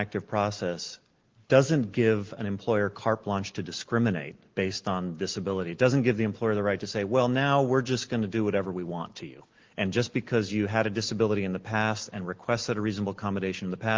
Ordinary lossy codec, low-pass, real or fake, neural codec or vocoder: Opus, 16 kbps; 7.2 kHz; real; none